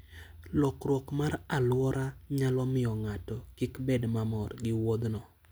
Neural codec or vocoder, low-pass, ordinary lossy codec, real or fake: none; none; none; real